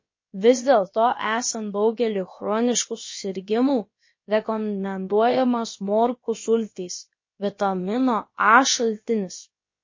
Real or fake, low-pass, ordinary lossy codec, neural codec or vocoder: fake; 7.2 kHz; MP3, 32 kbps; codec, 16 kHz, about 1 kbps, DyCAST, with the encoder's durations